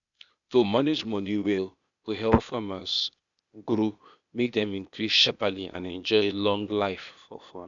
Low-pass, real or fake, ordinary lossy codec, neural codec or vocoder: 7.2 kHz; fake; none; codec, 16 kHz, 0.8 kbps, ZipCodec